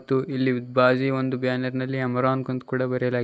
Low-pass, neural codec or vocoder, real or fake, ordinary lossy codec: none; none; real; none